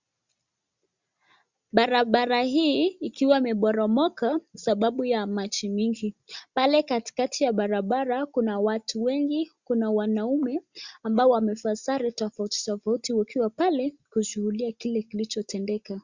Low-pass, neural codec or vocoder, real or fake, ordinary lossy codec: 7.2 kHz; none; real; Opus, 64 kbps